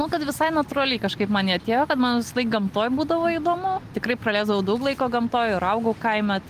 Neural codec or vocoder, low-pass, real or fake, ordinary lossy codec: none; 14.4 kHz; real; Opus, 24 kbps